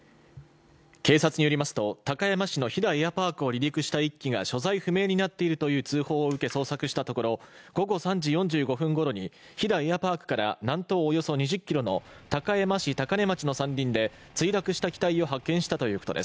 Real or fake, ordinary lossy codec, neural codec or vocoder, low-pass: real; none; none; none